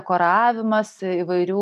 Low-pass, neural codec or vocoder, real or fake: 14.4 kHz; none; real